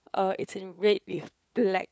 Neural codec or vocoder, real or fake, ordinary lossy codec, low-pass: codec, 16 kHz, 4 kbps, FunCodec, trained on LibriTTS, 50 frames a second; fake; none; none